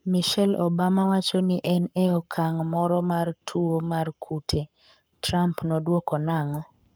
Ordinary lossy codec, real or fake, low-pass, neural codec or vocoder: none; fake; none; codec, 44.1 kHz, 7.8 kbps, Pupu-Codec